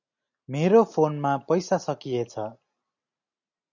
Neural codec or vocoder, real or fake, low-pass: none; real; 7.2 kHz